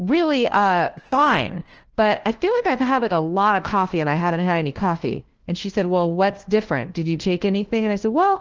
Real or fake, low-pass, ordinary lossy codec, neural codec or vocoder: fake; 7.2 kHz; Opus, 16 kbps; codec, 16 kHz, 1 kbps, FunCodec, trained on LibriTTS, 50 frames a second